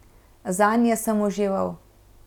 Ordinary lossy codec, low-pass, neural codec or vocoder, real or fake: none; 19.8 kHz; none; real